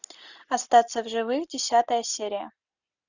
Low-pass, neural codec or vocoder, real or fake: 7.2 kHz; none; real